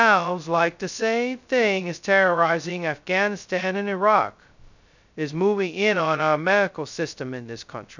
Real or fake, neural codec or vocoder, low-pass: fake; codec, 16 kHz, 0.2 kbps, FocalCodec; 7.2 kHz